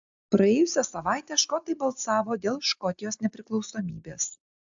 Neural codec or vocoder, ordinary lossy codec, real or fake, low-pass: none; AAC, 64 kbps; real; 7.2 kHz